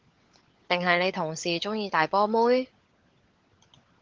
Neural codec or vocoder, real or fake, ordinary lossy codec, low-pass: codec, 16 kHz, 16 kbps, FunCodec, trained on LibriTTS, 50 frames a second; fake; Opus, 16 kbps; 7.2 kHz